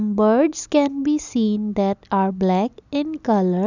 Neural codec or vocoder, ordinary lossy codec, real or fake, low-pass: none; none; real; 7.2 kHz